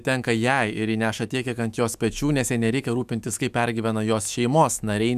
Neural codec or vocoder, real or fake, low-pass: autoencoder, 48 kHz, 128 numbers a frame, DAC-VAE, trained on Japanese speech; fake; 14.4 kHz